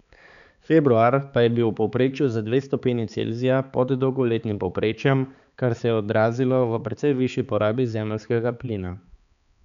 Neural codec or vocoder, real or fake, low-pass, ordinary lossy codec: codec, 16 kHz, 4 kbps, X-Codec, HuBERT features, trained on balanced general audio; fake; 7.2 kHz; none